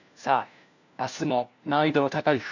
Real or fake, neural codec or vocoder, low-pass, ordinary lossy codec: fake; codec, 16 kHz, 1 kbps, FunCodec, trained on LibriTTS, 50 frames a second; 7.2 kHz; none